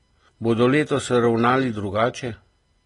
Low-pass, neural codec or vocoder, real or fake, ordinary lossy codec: 10.8 kHz; none; real; AAC, 32 kbps